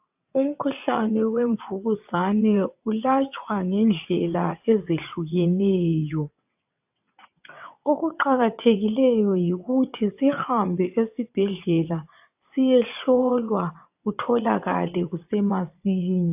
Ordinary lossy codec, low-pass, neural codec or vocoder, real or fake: AAC, 32 kbps; 3.6 kHz; vocoder, 22.05 kHz, 80 mel bands, WaveNeXt; fake